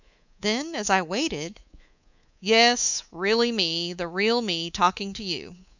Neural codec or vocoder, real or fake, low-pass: codec, 24 kHz, 3.1 kbps, DualCodec; fake; 7.2 kHz